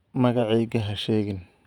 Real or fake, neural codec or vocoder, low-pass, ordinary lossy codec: fake; vocoder, 48 kHz, 128 mel bands, Vocos; 19.8 kHz; none